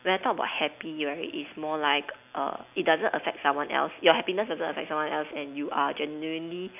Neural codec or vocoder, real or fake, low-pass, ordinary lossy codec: none; real; 3.6 kHz; none